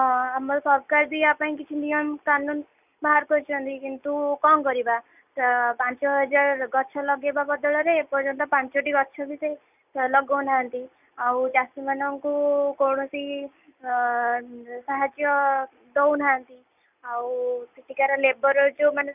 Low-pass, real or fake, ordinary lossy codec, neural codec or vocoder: 3.6 kHz; real; none; none